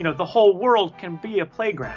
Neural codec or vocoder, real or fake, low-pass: none; real; 7.2 kHz